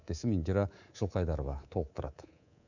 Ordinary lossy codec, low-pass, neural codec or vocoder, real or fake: none; 7.2 kHz; codec, 24 kHz, 3.1 kbps, DualCodec; fake